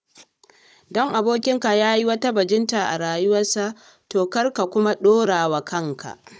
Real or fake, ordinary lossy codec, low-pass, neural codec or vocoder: fake; none; none; codec, 16 kHz, 16 kbps, FunCodec, trained on Chinese and English, 50 frames a second